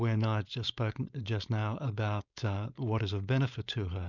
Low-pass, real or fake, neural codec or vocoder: 7.2 kHz; fake; codec, 16 kHz, 4.8 kbps, FACodec